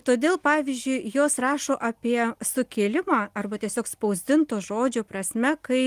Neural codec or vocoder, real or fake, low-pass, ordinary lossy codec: none; real; 14.4 kHz; Opus, 24 kbps